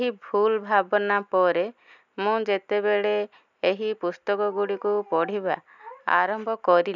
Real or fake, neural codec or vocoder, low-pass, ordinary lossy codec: real; none; 7.2 kHz; none